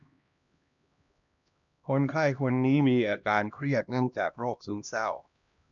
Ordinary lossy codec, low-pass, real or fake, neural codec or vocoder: MP3, 96 kbps; 7.2 kHz; fake; codec, 16 kHz, 1 kbps, X-Codec, HuBERT features, trained on LibriSpeech